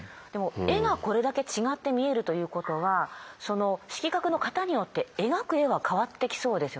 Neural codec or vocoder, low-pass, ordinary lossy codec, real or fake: none; none; none; real